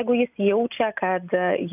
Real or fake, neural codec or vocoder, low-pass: real; none; 3.6 kHz